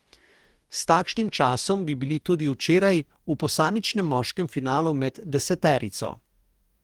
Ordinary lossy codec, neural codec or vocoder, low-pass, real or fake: Opus, 24 kbps; codec, 44.1 kHz, 2.6 kbps, DAC; 19.8 kHz; fake